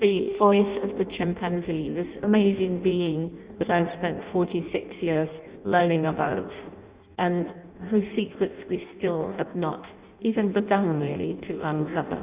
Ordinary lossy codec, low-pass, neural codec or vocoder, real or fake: Opus, 64 kbps; 3.6 kHz; codec, 16 kHz in and 24 kHz out, 0.6 kbps, FireRedTTS-2 codec; fake